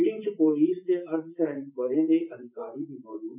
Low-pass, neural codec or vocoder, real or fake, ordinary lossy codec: 3.6 kHz; vocoder, 44.1 kHz, 128 mel bands, Pupu-Vocoder; fake; none